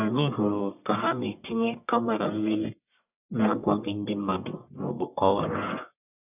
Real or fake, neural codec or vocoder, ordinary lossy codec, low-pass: fake; codec, 44.1 kHz, 1.7 kbps, Pupu-Codec; none; 3.6 kHz